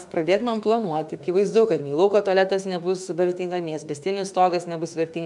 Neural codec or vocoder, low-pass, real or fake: autoencoder, 48 kHz, 32 numbers a frame, DAC-VAE, trained on Japanese speech; 10.8 kHz; fake